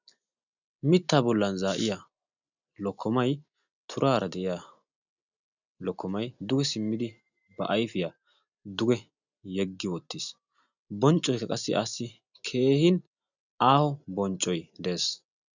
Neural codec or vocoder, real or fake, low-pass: none; real; 7.2 kHz